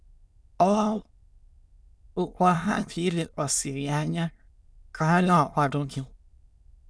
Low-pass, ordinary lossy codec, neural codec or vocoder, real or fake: none; none; autoencoder, 22.05 kHz, a latent of 192 numbers a frame, VITS, trained on many speakers; fake